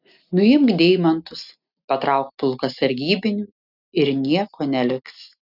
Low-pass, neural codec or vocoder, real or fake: 5.4 kHz; none; real